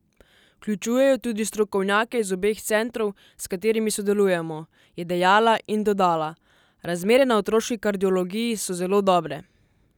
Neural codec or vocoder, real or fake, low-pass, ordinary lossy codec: none; real; 19.8 kHz; none